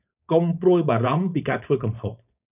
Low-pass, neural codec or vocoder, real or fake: 3.6 kHz; codec, 16 kHz, 4.8 kbps, FACodec; fake